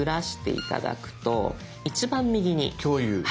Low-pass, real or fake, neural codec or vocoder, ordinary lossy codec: none; real; none; none